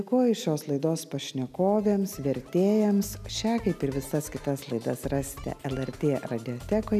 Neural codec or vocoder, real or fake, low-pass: none; real; 14.4 kHz